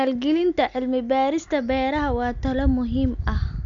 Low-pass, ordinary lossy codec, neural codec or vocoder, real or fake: 7.2 kHz; none; none; real